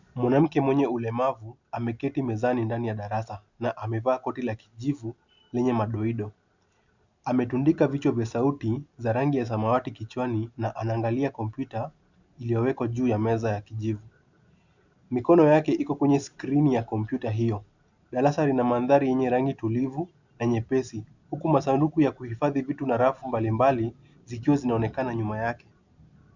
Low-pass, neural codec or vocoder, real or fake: 7.2 kHz; none; real